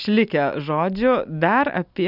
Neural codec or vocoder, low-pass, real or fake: none; 5.4 kHz; real